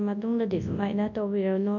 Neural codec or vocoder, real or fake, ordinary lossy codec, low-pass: codec, 24 kHz, 0.9 kbps, WavTokenizer, large speech release; fake; none; 7.2 kHz